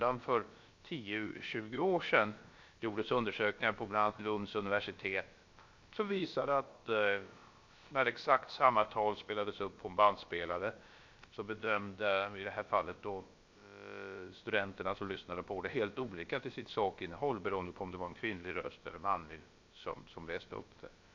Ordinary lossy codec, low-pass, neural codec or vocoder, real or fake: MP3, 48 kbps; 7.2 kHz; codec, 16 kHz, about 1 kbps, DyCAST, with the encoder's durations; fake